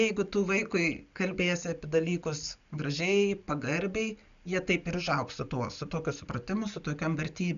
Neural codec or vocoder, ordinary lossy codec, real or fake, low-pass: none; MP3, 96 kbps; real; 7.2 kHz